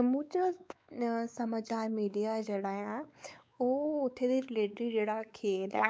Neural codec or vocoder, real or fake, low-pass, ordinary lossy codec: codec, 16 kHz, 4 kbps, X-Codec, WavLM features, trained on Multilingual LibriSpeech; fake; none; none